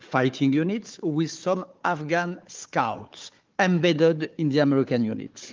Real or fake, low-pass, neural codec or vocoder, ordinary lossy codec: fake; 7.2 kHz; codec, 16 kHz, 4 kbps, FunCodec, trained on Chinese and English, 50 frames a second; Opus, 32 kbps